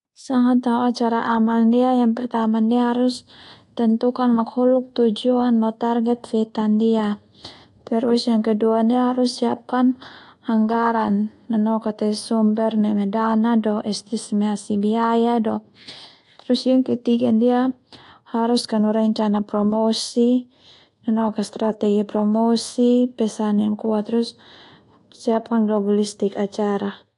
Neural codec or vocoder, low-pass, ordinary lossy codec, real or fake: codec, 24 kHz, 1.2 kbps, DualCodec; 10.8 kHz; AAC, 48 kbps; fake